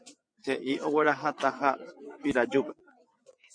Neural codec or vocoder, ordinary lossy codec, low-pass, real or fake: vocoder, 22.05 kHz, 80 mel bands, WaveNeXt; MP3, 48 kbps; 9.9 kHz; fake